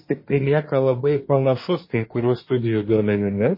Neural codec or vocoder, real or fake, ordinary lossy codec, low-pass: codec, 24 kHz, 1 kbps, SNAC; fake; MP3, 24 kbps; 5.4 kHz